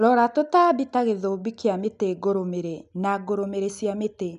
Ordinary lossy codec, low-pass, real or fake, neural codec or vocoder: none; 10.8 kHz; real; none